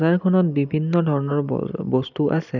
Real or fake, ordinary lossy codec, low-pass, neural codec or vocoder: real; none; 7.2 kHz; none